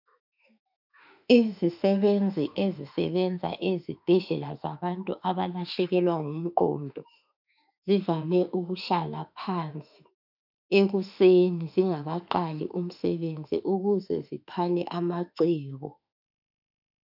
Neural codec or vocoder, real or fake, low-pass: autoencoder, 48 kHz, 32 numbers a frame, DAC-VAE, trained on Japanese speech; fake; 5.4 kHz